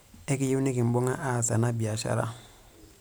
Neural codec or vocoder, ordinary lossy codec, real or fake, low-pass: vocoder, 44.1 kHz, 128 mel bands every 512 samples, BigVGAN v2; none; fake; none